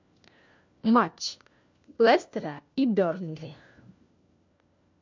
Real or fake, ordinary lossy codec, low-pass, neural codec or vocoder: fake; MP3, 48 kbps; 7.2 kHz; codec, 16 kHz, 1 kbps, FunCodec, trained on LibriTTS, 50 frames a second